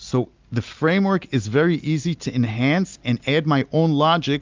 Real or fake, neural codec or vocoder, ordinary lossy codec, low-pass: real; none; Opus, 24 kbps; 7.2 kHz